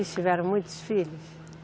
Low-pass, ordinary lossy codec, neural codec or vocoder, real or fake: none; none; none; real